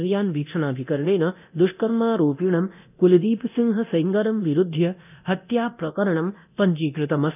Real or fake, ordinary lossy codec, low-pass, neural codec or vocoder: fake; none; 3.6 kHz; codec, 24 kHz, 0.9 kbps, DualCodec